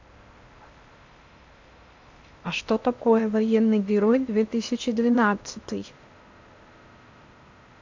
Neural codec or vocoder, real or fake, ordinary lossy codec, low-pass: codec, 16 kHz in and 24 kHz out, 0.8 kbps, FocalCodec, streaming, 65536 codes; fake; MP3, 64 kbps; 7.2 kHz